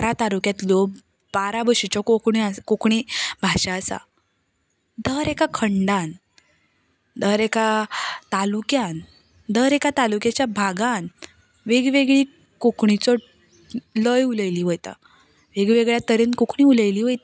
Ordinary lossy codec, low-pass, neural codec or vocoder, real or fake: none; none; none; real